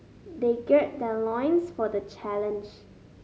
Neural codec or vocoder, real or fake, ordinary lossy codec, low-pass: none; real; none; none